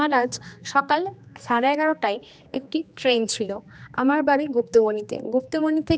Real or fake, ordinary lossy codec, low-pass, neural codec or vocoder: fake; none; none; codec, 16 kHz, 2 kbps, X-Codec, HuBERT features, trained on general audio